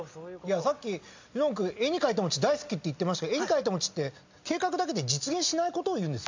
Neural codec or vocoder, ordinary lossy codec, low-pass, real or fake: none; MP3, 64 kbps; 7.2 kHz; real